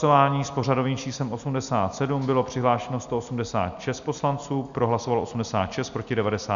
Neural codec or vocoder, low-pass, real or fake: none; 7.2 kHz; real